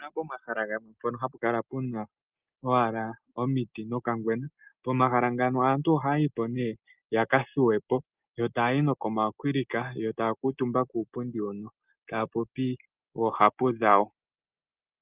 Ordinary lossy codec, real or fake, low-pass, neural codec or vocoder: Opus, 24 kbps; real; 3.6 kHz; none